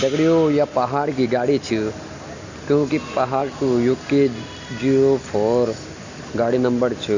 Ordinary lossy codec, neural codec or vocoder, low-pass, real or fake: Opus, 64 kbps; none; 7.2 kHz; real